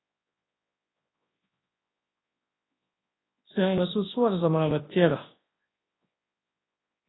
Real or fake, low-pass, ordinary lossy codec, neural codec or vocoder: fake; 7.2 kHz; AAC, 16 kbps; codec, 24 kHz, 0.9 kbps, WavTokenizer, large speech release